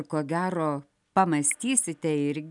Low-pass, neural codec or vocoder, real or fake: 10.8 kHz; none; real